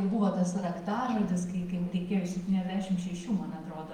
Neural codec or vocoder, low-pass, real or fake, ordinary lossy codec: none; 19.8 kHz; real; Opus, 32 kbps